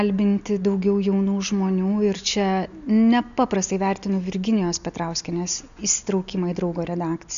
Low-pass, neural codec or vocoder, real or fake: 7.2 kHz; none; real